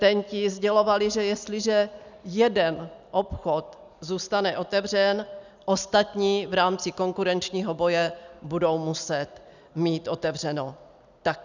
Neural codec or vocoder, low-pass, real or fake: none; 7.2 kHz; real